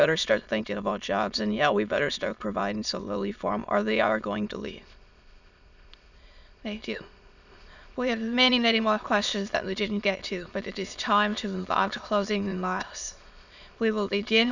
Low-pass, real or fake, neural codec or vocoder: 7.2 kHz; fake; autoencoder, 22.05 kHz, a latent of 192 numbers a frame, VITS, trained on many speakers